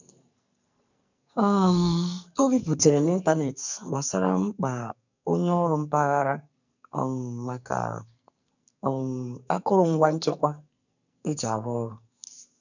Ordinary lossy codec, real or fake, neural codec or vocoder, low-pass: none; fake; codec, 44.1 kHz, 2.6 kbps, SNAC; 7.2 kHz